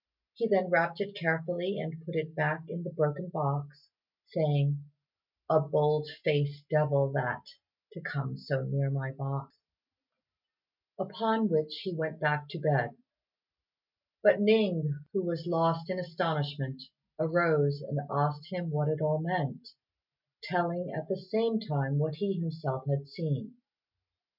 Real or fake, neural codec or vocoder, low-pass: real; none; 5.4 kHz